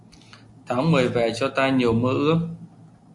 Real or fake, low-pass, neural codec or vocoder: real; 10.8 kHz; none